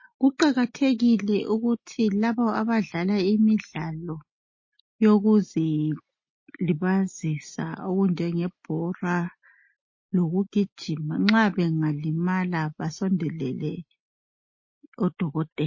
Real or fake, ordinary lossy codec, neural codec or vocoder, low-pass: real; MP3, 32 kbps; none; 7.2 kHz